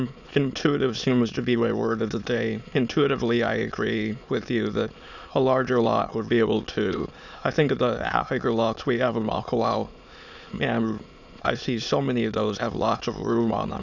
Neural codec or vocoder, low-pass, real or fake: autoencoder, 22.05 kHz, a latent of 192 numbers a frame, VITS, trained on many speakers; 7.2 kHz; fake